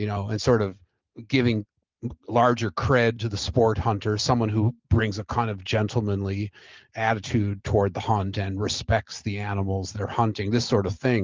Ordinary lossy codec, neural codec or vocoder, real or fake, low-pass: Opus, 32 kbps; none; real; 7.2 kHz